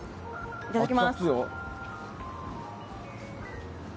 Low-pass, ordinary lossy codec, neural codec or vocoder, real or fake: none; none; none; real